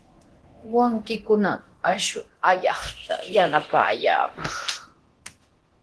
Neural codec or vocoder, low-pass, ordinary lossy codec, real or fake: codec, 24 kHz, 0.9 kbps, DualCodec; 10.8 kHz; Opus, 16 kbps; fake